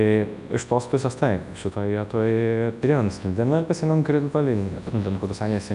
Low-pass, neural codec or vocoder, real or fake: 10.8 kHz; codec, 24 kHz, 0.9 kbps, WavTokenizer, large speech release; fake